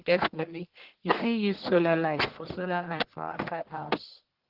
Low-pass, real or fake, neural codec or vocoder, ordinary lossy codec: 5.4 kHz; fake; codec, 44.1 kHz, 1.7 kbps, Pupu-Codec; Opus, 16 kbps